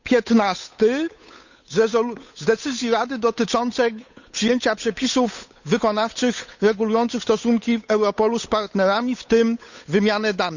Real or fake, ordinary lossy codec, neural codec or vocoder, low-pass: fake; none; codec, 16 kHz, 8 kbps, FunCodec, trained on Chinese and English, 25 frames a second; 7.2 kHz